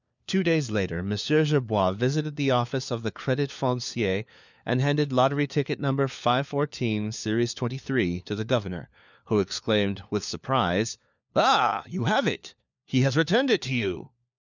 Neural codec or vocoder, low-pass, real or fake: codec, 16 kHz, 4 kbps, FunCodec, trained on LibriTTS, 50 frames a second; 7.2 kHz; fake